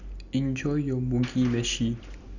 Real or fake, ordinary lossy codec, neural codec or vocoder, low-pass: real; none; none; 7.2 kHz